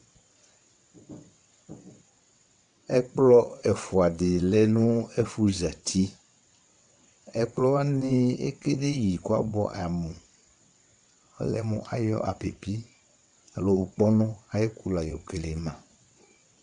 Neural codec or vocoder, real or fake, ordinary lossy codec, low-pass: vocoder, 22.05 kHz, 80 mel bands, WaveNeXt; fake; MP3, 64 kbps; 9.9 kHz